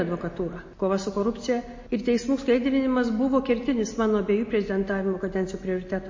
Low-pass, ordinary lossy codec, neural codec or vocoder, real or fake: 7.2 kHz; MP3, 32 kbps; none; real